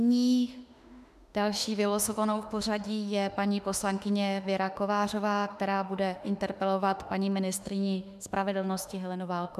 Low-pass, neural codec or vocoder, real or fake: 14.4 kHz; autoencoder, 48 kHz, 32 numbers a frame, DAC-VAE, trained on Japanese speech; fake